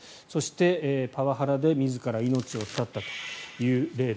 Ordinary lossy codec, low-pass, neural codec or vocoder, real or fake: none; none; none; real